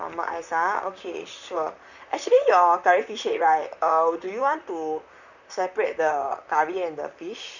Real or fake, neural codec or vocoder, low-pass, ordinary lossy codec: fake; vocoder, 44.1 kHz, 128 mel bands, Pupu-Vocoder; 7.2 kHz; none